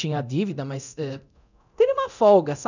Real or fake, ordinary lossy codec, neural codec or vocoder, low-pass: fake; none; codec, 24 kHz, 0.9 kbps, DualCodec; 7.2 kHz